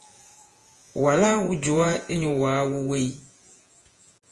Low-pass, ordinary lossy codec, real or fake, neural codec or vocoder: 10.8 kHz; Opus, 24 kbps; fake; vocoder, 48 kHz, 128 mel bands, Vocos